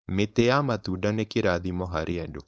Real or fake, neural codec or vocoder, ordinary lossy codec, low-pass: fake; codec, 16 kHz, 4.8 kbps, FACodec; none; none